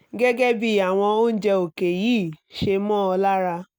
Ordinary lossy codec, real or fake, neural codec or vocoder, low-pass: none; real; none; none